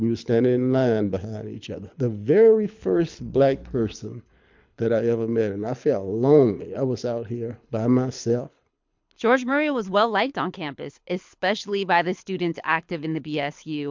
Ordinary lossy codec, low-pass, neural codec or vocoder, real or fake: MP3, 64 kbps; 7.2 kHz; codec, 24 kHz, 6 kbps, HILCodec; fake